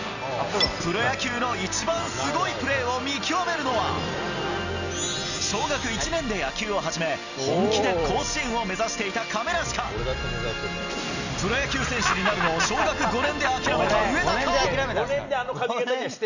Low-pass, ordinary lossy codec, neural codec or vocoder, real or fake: 7.2 kHz; none; none; real